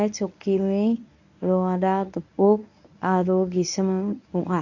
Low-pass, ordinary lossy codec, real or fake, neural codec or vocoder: 7.2 kHz; none; fake; codec, 24 kHz, 0.9 kbps, WavTokenizer, medium speech release version 1